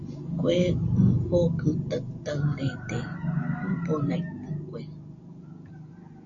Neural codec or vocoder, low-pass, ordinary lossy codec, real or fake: none; 7.2 kHz; AAC, 48 kbps; real